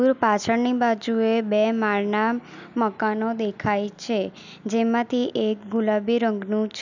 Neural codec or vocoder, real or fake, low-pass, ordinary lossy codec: none; real; 7.2 kHz; none